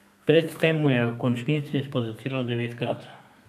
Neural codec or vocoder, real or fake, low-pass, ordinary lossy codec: codec, 32 kHz, 1.9 kbps, SNAC; fake; 14.4 kHz; none